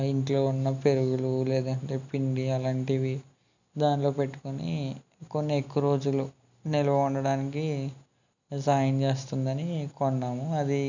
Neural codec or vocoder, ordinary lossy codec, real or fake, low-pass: none; none; real; 7.2 kHz